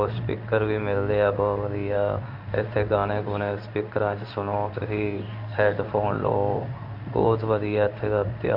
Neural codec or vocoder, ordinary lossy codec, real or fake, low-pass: codec, 16 kHz in and 24 kHz out, 1 kbps, XY-Tokenizer; none; fake; 5.4 kHz